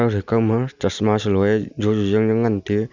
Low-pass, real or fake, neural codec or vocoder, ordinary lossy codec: 7.2 kHz; real; none; Opus, 64 kbps